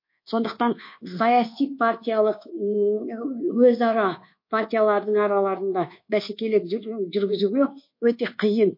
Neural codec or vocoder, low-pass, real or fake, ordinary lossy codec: codec, 24 kHz, 1.2 kbps, DualCodec; 5.4 kHz; fake; MP3, 32 kbps